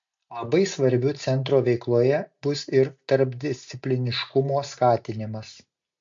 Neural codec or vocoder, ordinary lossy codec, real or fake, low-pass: none; MP3, 48 kbps; real; 7.2 kHz